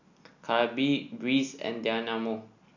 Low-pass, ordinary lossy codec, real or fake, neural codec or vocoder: 7.2 kHz; none; real; none